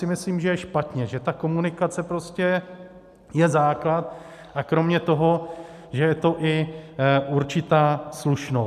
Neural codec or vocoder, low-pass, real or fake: none; 14.4 kHz; real